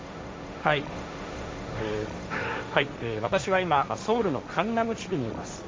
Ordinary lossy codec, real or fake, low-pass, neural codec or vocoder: none; fake; none; codec, 16 kHz, 1.1 kbps, Voila-Tokenizer